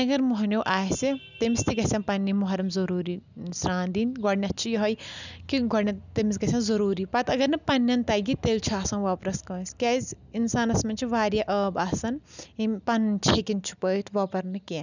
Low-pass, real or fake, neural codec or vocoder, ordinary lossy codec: 7.2 kHz; real; none; none